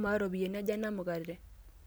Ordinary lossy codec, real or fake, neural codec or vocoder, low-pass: none; real; none; none